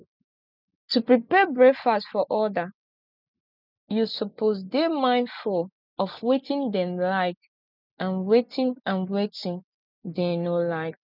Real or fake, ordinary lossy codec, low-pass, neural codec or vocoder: real; none; 5.4 kHz; none